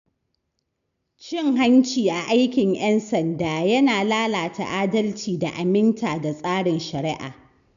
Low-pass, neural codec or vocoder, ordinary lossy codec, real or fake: 7.2 kHz; none; none; real